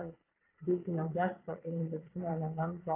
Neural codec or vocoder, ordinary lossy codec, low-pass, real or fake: vocoder, 22.05 kHz, 80 mel bands, Vocos; AAC, 24 kbps; 3.6 kHz; fake